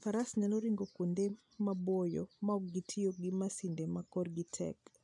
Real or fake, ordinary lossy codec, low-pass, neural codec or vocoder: real; none; 10.8 kHz; none